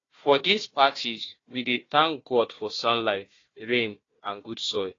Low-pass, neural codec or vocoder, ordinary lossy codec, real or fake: 7.2 kHz; codec, 16 kHz, 1 kbps, FunCodec, trained on Chinese and English, 50 frames a second; AAC, 32 kbps; fake